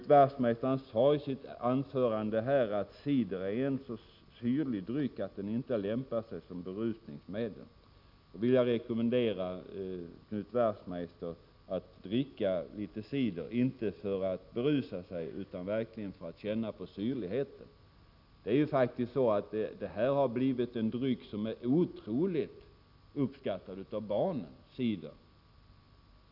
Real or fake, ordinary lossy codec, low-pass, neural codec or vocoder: real; none; 5.4 kHz; none